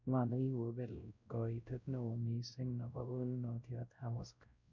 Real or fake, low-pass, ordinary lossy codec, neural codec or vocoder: fake; 7.2 kHz; MP3, 64 kbps; codec, 16 kHz, 0.5 kbps, X-Codec, WavLM features, trained on Multilingual LibriSpeech